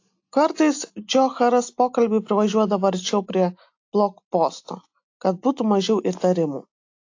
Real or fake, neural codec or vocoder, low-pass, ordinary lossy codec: real; none; 7.2 kHz; AAC, 48 kbps